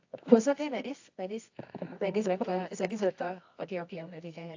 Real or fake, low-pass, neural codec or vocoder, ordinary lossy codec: fake; 7.2 kHz; codec, 24 kHz, 0.9 kbps, WavTokenizer, medium music audio release; AAC, 48 kbps